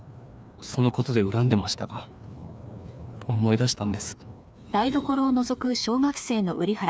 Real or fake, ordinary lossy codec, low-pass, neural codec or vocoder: fake; none; none; codec, 16 kHz, 2 kbps, FreqCodec, larger model